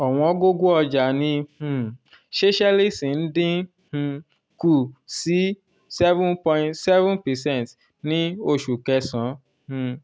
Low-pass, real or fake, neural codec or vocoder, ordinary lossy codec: none; real; none; none